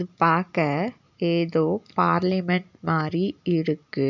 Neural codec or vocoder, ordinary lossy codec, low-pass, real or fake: none; none; 7.2 kHz; real